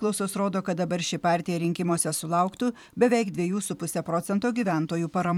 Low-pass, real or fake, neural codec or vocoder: 19.8 kHz; fake; vocoder, 44.1 kHz, 128 mel bands every 512 samples, BigVGAN v2